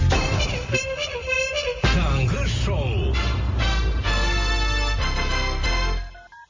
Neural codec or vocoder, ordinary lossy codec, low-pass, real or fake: none; MP3, 32 kbps; 7.2 kHz; real